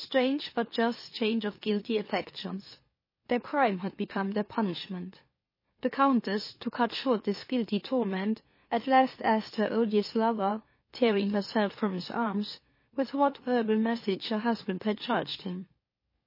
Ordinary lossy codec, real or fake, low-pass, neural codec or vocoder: MP3, 24 kbps; fake; 5.4 kHz; autoencoder, 44.1 kHz, a latent of 192 numbers a frame, MeloTTS